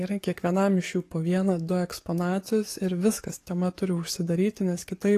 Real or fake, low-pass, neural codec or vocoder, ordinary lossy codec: fake; 14.4 kHz; codec, 44.1 kHz, 7.8 kbps, DAC; AAC, 48 kbps